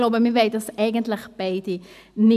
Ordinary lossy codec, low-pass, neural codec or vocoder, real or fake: none; 14.4 kHz; none; real